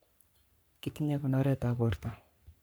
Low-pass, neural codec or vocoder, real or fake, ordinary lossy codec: none; codec, 44.1 kHz, 3.4 kbps, Pupu-Codec; fake; none